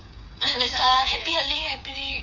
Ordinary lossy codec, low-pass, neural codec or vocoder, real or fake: MP3, 64 kbps; 7.2 kHz; codec, 16 kHz, 8 kbps, FreqCodec, smaller model; fake